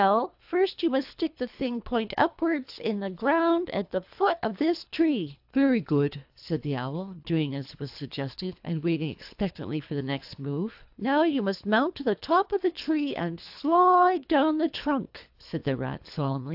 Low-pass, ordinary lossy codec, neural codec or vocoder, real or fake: 5.4 kHz; AAC, 48 kbps; codec, 24 kHz, 3 kbps, HILCodec; fake